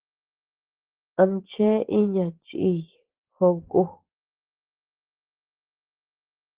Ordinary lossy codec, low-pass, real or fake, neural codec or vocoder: Opus, 16 kbps; 3.6 kHz; fake; vocoder, 24 kHz, 100 mel bands, Vocos